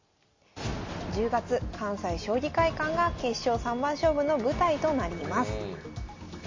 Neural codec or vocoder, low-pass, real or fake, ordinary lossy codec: none; 7.2 kHz; real; MP3, 32 kbps